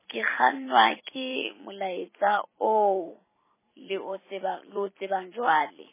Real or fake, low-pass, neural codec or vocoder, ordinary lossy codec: fake; 3.6 kHz; vocoder, 44.1 kHz, 128 mel bands every 256 samples, BigVGAN v2; MP3, 16 kbps